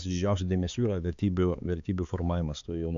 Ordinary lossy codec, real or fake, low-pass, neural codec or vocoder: AAC, 96 kbps; fake; 7.2 kHz; codec, 16 kHz, 4 kbps, X-Codec, HuBERT features, trained on balanced general audio